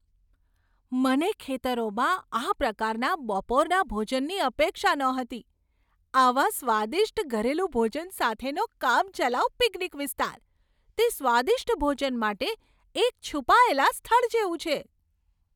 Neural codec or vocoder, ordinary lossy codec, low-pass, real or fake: none; none; 19.8 kHz; real